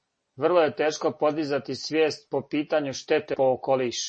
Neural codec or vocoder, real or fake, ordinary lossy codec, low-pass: none; real; MP3, 32 kbps; 9.9 kHz